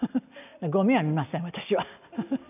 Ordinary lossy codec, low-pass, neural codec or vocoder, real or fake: none; 3.6 kHz; none; real